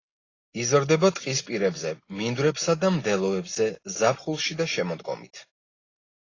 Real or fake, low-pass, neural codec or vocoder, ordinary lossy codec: real; 7.2 kHz; none; AAC, 32 kbps